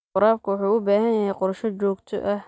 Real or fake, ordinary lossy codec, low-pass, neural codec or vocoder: real; none; none; none